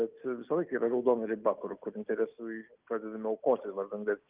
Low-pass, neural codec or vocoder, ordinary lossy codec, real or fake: 3.6 kHz; none; Opus, 32 kbps; real